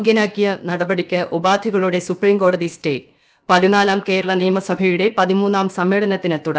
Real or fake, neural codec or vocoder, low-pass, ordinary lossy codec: fake; codec, 16 kHz, about 1 kbps, DyCAST, with the encoder's durations; none; none